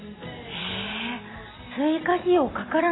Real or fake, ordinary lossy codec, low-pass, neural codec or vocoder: real; AAC, 16 kbps; 7.2 kHz; none